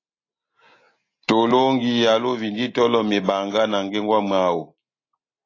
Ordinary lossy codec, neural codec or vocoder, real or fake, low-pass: AAC, 32 kbps; none; real; 7.2 kHz